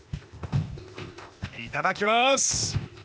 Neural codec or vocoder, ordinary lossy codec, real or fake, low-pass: codec, 16 kHz, 0.8 kbps, ZipCodec; none; fake; none